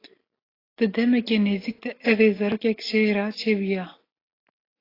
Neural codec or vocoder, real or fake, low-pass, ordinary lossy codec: none; real; 5.4 kHz; AAC, 24 kbps